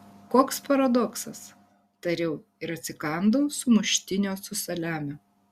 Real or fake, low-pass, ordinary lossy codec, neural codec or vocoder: real; 14.4 kHz; Opus, 64 kbps; none